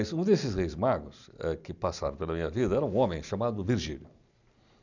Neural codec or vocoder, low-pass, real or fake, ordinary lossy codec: none; 7.2 kHz; real; none